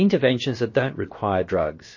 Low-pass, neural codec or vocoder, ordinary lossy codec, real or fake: 7.2 kHz; codec, 16 kHz, about 1 kbps, DyCAST, with the encoder's durations; MP3, 32 kbps; fake